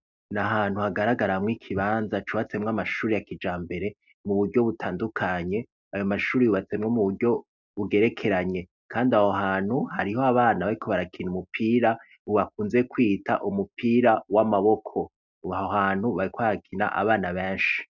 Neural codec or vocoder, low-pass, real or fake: none; 7.2 kHz; real